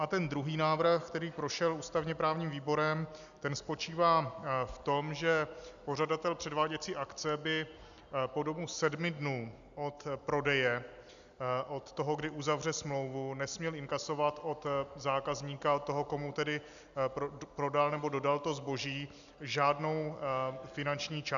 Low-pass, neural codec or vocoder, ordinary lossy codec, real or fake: 7.2 kHz; none; MP3, 96 kbps; real